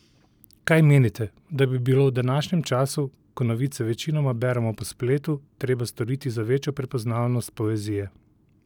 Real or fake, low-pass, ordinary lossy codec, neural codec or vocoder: real; 19.8 kHz; none; none